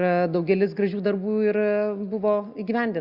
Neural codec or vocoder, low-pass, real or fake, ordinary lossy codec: none; 5.4 kHz; real; Opus, 64 kbps